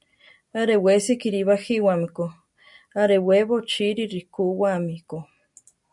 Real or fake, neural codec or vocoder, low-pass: real; none; 10.8 kHz